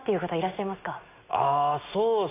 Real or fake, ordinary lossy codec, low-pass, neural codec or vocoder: real; AAC, 24 kbps; 3.6 kHz; none